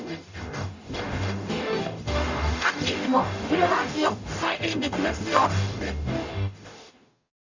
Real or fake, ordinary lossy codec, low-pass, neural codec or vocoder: fake; Opus, 64 kbps; 7.2 kHz; codec, 44.1 kHz, 0.9 kbps, DAC